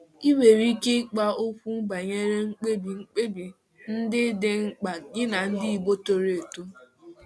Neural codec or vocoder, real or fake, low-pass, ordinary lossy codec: none; real; none; none